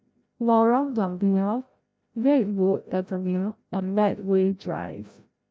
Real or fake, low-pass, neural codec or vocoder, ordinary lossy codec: fake; none; codec, 16 kHz, 0.5 kbps, FreqCodec, larger model; none